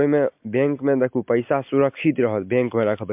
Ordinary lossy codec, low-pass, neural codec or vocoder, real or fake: MP3, 32 kbps; 3.6 kHz; none; real